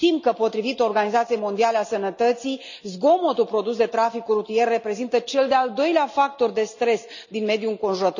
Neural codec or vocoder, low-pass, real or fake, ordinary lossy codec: none; 7.2 kHz; real; none